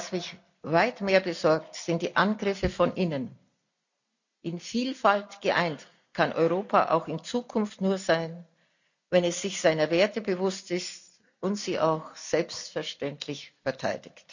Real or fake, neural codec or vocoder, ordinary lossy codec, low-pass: real; none; none; 7.2 kHz